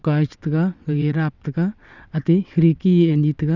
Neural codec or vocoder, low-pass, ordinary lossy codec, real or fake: vocoder, 22.05 kHz, 80 mel bands, WaveNeXt; 7.2 kHz; none; fake